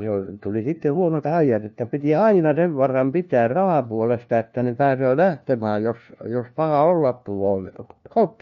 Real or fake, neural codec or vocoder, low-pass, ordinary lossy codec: fake; codec, 16 kHz, 1 kbps, FunCodec, trained on LibriTTS, 50 frames a second; 7.2 kHz; MP3, 48 kbps